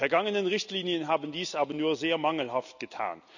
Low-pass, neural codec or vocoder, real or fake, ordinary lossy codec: 7.2 kHz; none; real; none